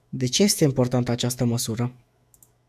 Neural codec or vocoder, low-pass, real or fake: autoencoder, 48 kHz, 128 numbers a frame, DAC-VAE, trained on Japanese speech; 14.4 kHz; fake